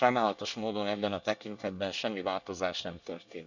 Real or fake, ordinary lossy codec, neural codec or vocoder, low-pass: fake; none; codec, 24 kHz, 1 kbps, SNAC; 7.2 kHz